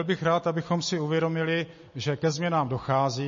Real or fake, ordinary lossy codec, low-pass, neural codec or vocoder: real; MP3, 32 kbps; 7.2 kHz; none